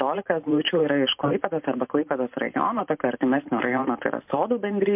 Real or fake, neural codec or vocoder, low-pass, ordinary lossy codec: fake; vocoder, 44.1 kHz, 128 mel bands every 512 samples, BigVGAN v2; 3.6 kHz; MP3, 32 kbps